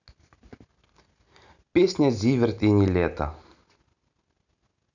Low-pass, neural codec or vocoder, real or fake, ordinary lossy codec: 7.2 kHz; none; real; none